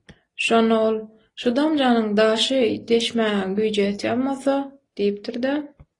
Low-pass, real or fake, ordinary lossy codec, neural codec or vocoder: 10.8 kHz; real; AAC, 32 kbps; none